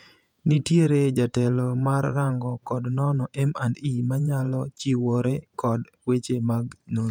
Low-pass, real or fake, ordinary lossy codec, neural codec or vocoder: 19.8 kHz; fake; none; vocoder, 48 kHz, 128 mel bands, Vocos